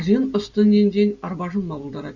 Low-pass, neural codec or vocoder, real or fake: 7.2 kHz; none; real